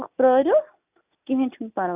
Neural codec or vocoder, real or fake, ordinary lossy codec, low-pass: none; real; none; 3.6 kHz